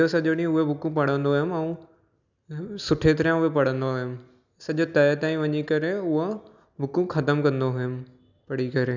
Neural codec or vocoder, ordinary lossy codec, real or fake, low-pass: none; none; real; 7.2 kHz